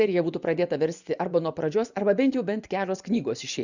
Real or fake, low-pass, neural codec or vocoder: fake; 7.2 kHz; vocoder, 24 kHz, 100 mel bands, Vocos